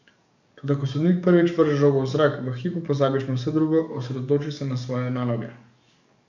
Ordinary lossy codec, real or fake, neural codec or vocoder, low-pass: none; fake; codec, 44.1 kHz, 7.8 kbps, DAC; 7.2 kHz